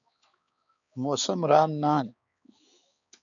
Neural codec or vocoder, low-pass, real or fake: codec, 16 kHz, 4 kbps, X-Codec, HuBERT features, trained on balanced general audio; 7.2 kHz; fake